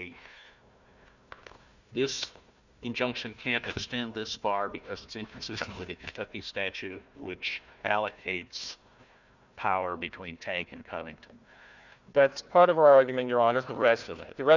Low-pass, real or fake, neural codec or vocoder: 7.2 kHz; fake; codec, 16 kHz, 1 kbps, FunCodec, trained on Chinese and English, 50 frames a second